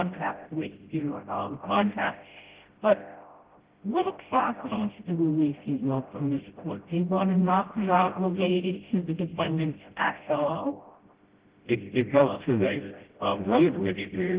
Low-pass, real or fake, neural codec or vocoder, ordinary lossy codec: 3.6 kHz; fake; codec, 16 kHz, 0.5 kbps, FreqCodec, smaller model; Opus, 16 kbps